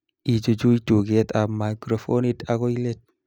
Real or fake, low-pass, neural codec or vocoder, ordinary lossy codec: real; 19.8 kHz; none; none